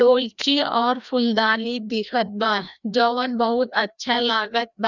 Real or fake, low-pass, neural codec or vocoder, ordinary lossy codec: fake; 7.2 kHz; codec, 16 kHz, 1 kbps, FreqCodec, larger model; none